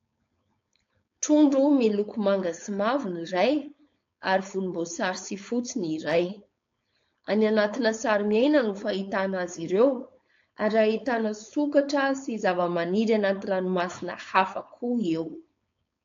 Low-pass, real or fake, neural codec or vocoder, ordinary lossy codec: 7.2 kHz; fake; codec, 16 kHz, 4.8 kbps, FACodec; AAC, 48 kbps